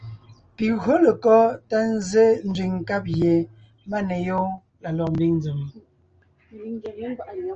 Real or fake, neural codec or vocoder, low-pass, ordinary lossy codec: real; none; 7.2 kHz; Opus, 24 kbps